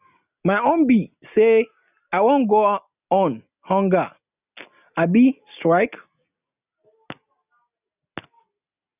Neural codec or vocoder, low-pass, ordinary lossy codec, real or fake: none; 3.6 kHz; none; real